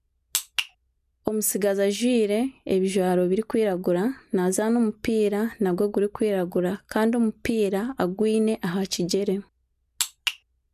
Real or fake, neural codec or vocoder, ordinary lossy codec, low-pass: real; none; none; 14.4 kHz